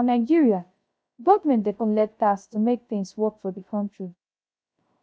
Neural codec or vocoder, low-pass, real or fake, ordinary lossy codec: codec, 16 kHz, 0.3 kbps, FocalCodec; none; fake; none